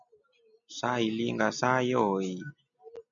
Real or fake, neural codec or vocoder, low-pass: real; none; 7.2 kHz